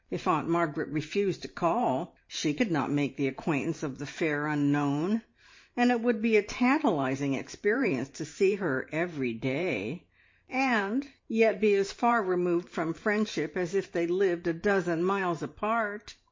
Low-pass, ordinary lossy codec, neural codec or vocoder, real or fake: 7.2 kHz; MP3, 32 kbps; vocoder, 44.1 kHz, 128 mel bands every 512 samples, BigVGAN v2; fake